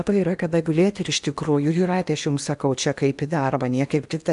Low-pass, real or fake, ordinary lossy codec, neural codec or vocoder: 10.8 kHz; fake; MP3, 96 kbps; codec, 16 kHz in and 24 kHz out, 0.8 kbps, FocalCodec, streaming, 65536 codes